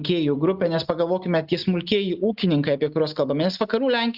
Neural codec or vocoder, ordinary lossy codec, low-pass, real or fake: none; Opus, 64 kbps; 5.4 kHz; real